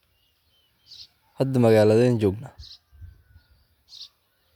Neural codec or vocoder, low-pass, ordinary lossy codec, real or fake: none; 19.8 kHz; none; real